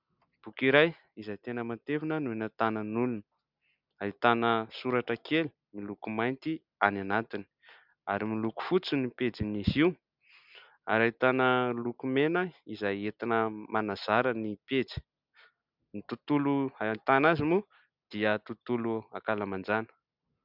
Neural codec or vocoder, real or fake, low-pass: none; real; 5.4 kHz